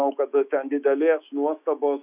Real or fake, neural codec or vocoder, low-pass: fake; autoencoder, 48 kHz, 128 numbers a frame, DAC-VAE, trained on Japanese speech; 3.6 kHz